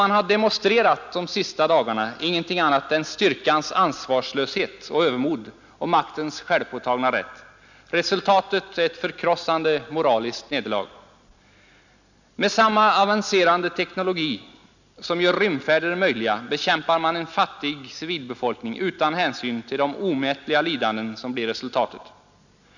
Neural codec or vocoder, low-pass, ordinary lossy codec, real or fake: none; 7.2 kHz; none; real